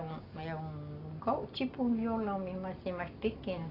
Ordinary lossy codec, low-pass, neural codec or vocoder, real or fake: AAC, 24 kbps; 5.4 kHz; none; real